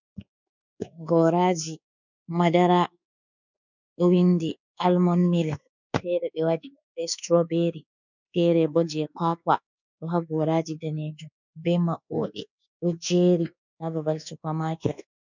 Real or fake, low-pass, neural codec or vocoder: fake; 7.2 kHz; codec, 24 kHz, 1.2 kbps, DualCodec